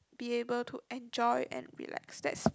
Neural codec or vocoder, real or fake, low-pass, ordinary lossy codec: none; real; none; none